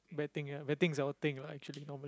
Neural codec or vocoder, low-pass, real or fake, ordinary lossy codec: none; none; real; none